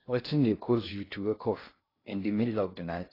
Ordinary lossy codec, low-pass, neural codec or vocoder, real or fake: AAC, 24 kbps; 5.4 kHz; codec, 16 kHz in and 24 kHz out, 0.6 kbps, FocalCodec, streaming, 2048 codes; fake